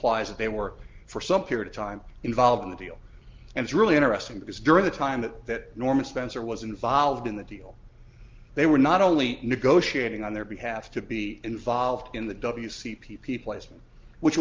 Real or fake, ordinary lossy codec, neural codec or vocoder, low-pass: real; Opus, 32 kbps; none; 7.2 kHz